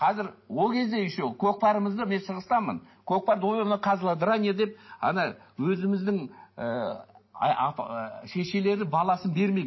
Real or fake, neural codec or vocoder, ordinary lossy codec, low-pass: real; none; MP3, 24 kbps; 7.2 kHz